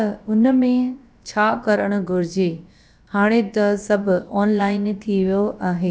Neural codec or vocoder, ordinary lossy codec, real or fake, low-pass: codec, 16 kHz, about 1 kbps, DyCAST, with the encoder's durations; none; fake; none